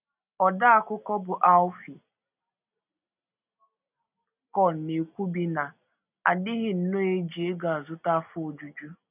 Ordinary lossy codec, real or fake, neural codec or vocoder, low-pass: none; real; none; 3.6 kHz